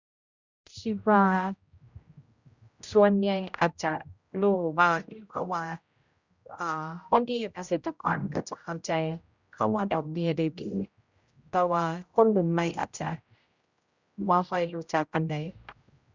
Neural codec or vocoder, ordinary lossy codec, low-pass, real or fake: codec, 16 kHz, 0.5 kbps, X-Codec, HuBERT features, trained on general audio; Opus, 64 kbps; 7.2 kHz; fake